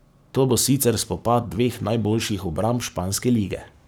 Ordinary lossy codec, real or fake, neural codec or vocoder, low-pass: none; fake; codec, 44.1 kHz, 7.8 kbps, Pupu-Codec; none